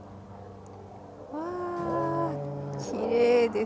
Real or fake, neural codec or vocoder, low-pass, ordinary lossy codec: real; none; none; none